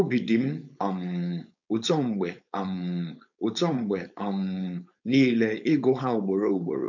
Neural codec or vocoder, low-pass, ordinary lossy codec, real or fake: codec, 16 kHz, 4.8 kbps, FACodec; 7.2 kHz; none; fake